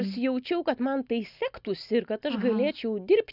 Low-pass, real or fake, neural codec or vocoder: 5.4 kHz; real; none